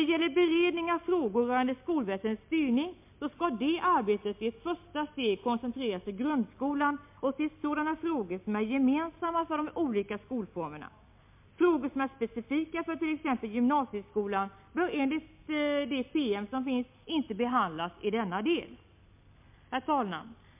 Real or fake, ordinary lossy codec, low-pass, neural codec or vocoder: real; MP3, 32 kbps; 3.6 kHz; none